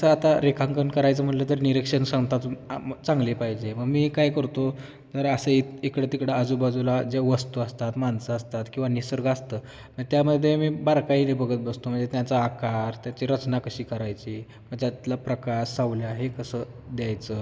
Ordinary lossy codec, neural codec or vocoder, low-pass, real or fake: none; none; none; real